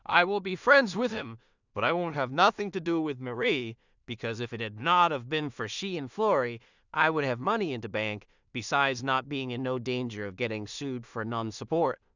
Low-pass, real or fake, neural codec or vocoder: 7.2 kHz; fake; codec, 16 kHz in and 24 kHz out, 0.4 kbps, LongCat-Audio-Codec, two codebook decoder